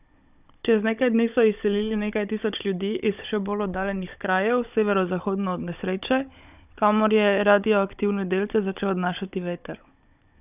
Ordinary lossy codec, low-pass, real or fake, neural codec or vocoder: none; 3.6 kHz; fake; codec, 16 kHz, 16 kbps, FunCodec, trained on Chinese and English, 50 frames a second